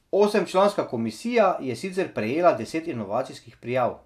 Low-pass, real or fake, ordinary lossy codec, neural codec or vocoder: 14.4 kHz; real; none; none